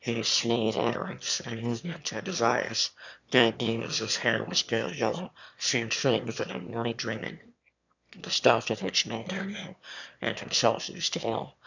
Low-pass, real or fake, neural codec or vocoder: 7.2 kHz; fake; autoencoder, 22.05 kHz, a latent of 192 numbers a frame, VITS, trained on one speaker